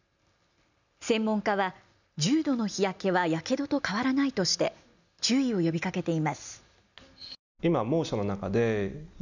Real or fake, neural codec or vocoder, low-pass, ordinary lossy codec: real; none; 7.2 kHz; none